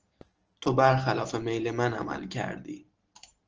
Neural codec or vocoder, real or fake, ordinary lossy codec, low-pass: none; real; Opus, 16 kbps; 7.2 kHz